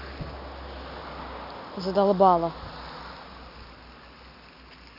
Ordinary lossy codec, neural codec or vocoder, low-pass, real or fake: none; none; 5.4 kHz; real